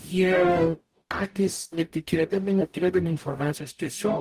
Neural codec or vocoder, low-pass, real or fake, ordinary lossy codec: codec, 44.1 kHz, 0.9 kbps, DAC; 14.4 kHz; fake; Opus, 24 kbps